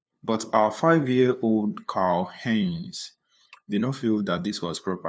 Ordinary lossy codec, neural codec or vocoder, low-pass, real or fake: none; codec, 16 kHz, 2 kbps, FunCodec, trained on LibriTTS, 25 frames a second; none; fake